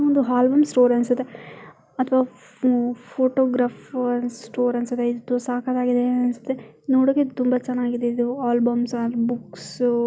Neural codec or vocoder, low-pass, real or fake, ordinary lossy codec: none; none; real; none